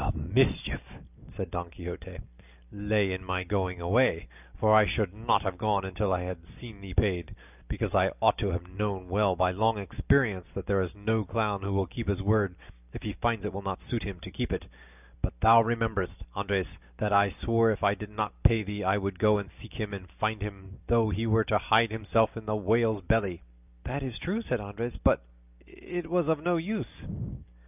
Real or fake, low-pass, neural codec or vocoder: real; 3.6 kHz; none